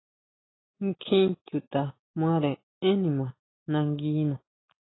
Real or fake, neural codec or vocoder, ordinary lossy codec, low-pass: real; none; AAC, 16 kbps; 7.2 kHz